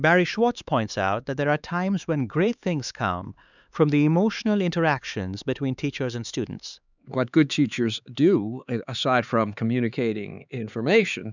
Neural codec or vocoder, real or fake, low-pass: codec, 16 kHz, 4 kbps, X-Codec, HuBERT features, trained on LibriSpeech; fake; 7.2 kHz